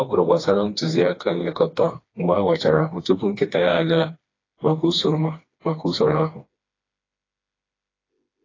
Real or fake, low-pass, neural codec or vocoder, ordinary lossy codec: fake; 7.2 kHz; codec, 16 kHz, 2 kbps, FreqCodec, smaller model; AAC, 32 kbps